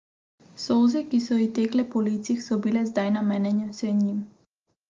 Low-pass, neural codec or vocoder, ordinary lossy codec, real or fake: 7.2 kHz; none; Opus, 32 kbps; real